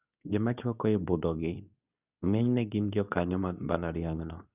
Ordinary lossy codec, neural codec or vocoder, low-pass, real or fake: none; codec, 24 kHz, 0.9 kbps, WavTokenizer, medium speech release version 2; 3.6 kHz; fake